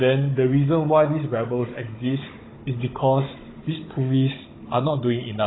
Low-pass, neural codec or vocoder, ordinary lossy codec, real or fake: 7.2 kHz; codec, 16 kHz, 16 kbps, FunCodec, trained on Chinese and English, 50 frames a second; AAC, 16 kbps; fake